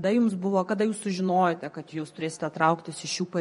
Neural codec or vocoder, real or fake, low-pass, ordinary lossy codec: vocoder, 24 kHz, 100 mel bands, Vocos; fake; 10.8 kHz; MP3, 48 kbps